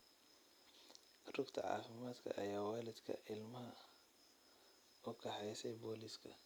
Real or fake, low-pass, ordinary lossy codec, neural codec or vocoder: real; none; none; none